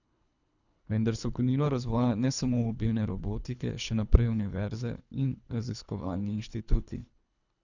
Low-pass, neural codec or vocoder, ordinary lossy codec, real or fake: 7.2 kHz; codec, 24 kHz, 3 kbps, HILCodec; none; fake